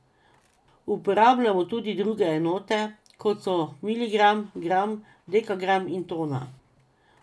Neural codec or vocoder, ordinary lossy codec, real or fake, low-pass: none; none; real; none